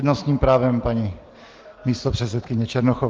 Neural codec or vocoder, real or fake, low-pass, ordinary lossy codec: none; real; 7.2 kHz; Opus, 16 kbps